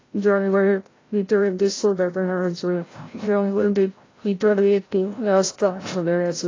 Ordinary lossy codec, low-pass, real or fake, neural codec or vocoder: AAC, 32 kbps; 7.2 kHz; fake; codec, 16 kHz, 0.5 kbps, FreqCodec, larger model